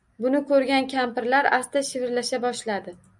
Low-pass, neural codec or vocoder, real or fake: 10.8 kHz; none; real